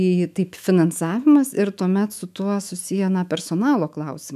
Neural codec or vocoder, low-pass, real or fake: autoencoder, 48 kHz, 128 numbers a frame, DAC-VAE, trained on Japanese speech; 14.4 kHz; fake